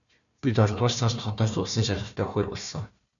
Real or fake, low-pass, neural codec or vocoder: fake; 7.2 kHz; codec, 16 kHz, 1 kbps, FunCodec, trained on Chinese and English, 50 frames a second